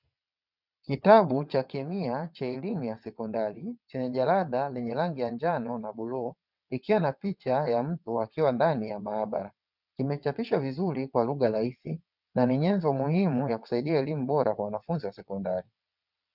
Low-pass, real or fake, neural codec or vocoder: 5.4 kHz; fake; vocoder, 22.05 kHz, 80 mel bands, WaveNeXt